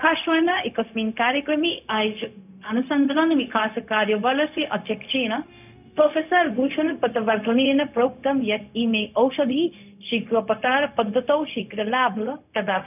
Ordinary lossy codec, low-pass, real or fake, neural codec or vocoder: none; 3.6 kHz; fake; codec, 16 kHz, 0.4 kbps, LongCat-Audio-Codec